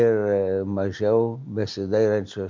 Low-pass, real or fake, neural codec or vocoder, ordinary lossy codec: 7.2 kHz; real; none; MP3, 64 kbps